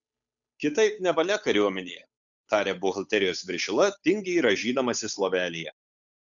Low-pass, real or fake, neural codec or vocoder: 7.2 kHz; fake; codec, 16 kHz, 8 kbps, FunCodec, trained on Chinese and English, 25 frames a second